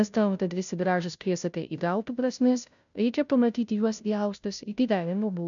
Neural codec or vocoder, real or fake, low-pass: codec, 16 kHz, 0.5 kbps, FunCodec, trained on Chinese and English, 25 frames a second; fake; 7.2 kHz